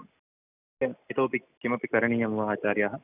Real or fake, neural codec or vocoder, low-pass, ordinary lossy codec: real; none; 3.6 kHz; none